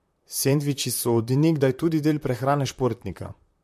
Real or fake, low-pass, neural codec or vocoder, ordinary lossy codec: fake; 14.4 kHz; vocoder, 44.1 kHz, 128 mel bands, Pupu-Vocoder; MP3, 64 kbps